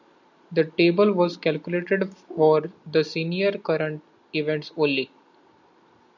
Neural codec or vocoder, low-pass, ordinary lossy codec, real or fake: none; 7.2 kHz; MP3, 64 kbps; real